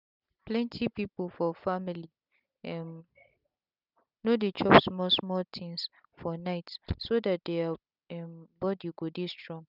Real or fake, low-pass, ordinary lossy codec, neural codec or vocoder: real; 5.4 kHz; none; none